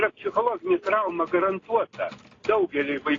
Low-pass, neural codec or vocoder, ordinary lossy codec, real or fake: 7.2 kHz; none; AAC, 32 kbps; real